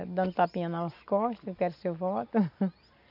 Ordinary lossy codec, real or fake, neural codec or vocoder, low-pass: AAC, 48 kbps; real; none; 5.4 kHz